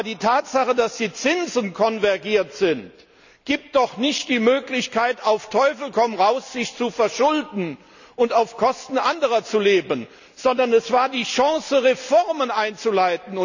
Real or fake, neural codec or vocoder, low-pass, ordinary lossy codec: real; none; 7.2 kHz; none